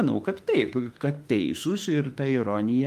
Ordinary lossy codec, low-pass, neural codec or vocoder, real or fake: Opus, 32 kbps; 14.4 kHz; autoencoder, 48 kHz, 32 numbers a frame, DAC-VAE, trained on Japanese speech; fake